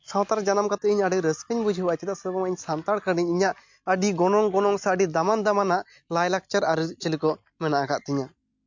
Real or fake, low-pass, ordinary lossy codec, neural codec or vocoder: real; 7.2 kHz; MP3, 48 kbps; none